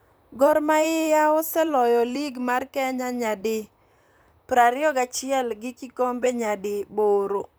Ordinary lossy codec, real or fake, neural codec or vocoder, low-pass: none; fake; vocoder, 44.1 kHz, 128 mel bands, Pupu-Vocoder; none